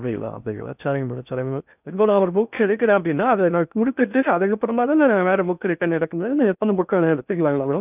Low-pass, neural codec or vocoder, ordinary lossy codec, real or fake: 3.6 kHz; codec, 16 kHz in and 24 kHz out, 0.6 kbps, FocalCodec, streaming, 4096 codes; none; fake